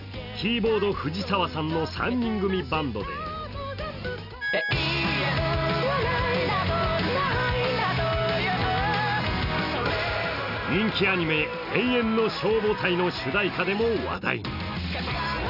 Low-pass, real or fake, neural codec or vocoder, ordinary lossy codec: 5.4 kHz; real; none; none